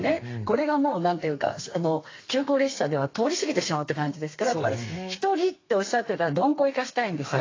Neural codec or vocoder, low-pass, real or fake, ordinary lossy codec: codec, 32 kHz, 1.9 kbps, SNAC; 7.2 kHz; fake; AAC, 32 kbps